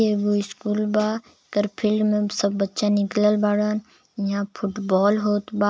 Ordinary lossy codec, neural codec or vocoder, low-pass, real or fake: none; none; none; real